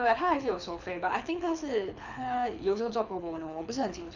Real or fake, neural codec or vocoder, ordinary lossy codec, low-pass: fake; codec, 24 kHz, 6 kbps, HILCodec; none; 7.2 kHz